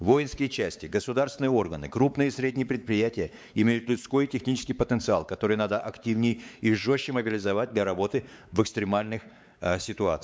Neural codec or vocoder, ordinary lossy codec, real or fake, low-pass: codec, 16 kHz, 4 kbps, X-Codec, WavLM features, trained on Multilingual LibriSpeech; none; fake; none